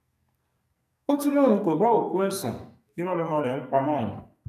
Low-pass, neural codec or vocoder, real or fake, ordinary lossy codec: 14.4 kHz; codec, 44.1 kHz, 2.6 kbps, SNAC; fake; none